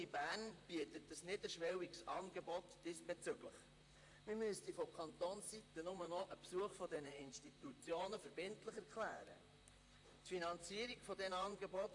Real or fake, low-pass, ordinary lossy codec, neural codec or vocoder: fake; 10.8 kHz; AAC, 48 kbps; vocoder, 44.1 kHz, 128 mel bands, Pupu-Vocoder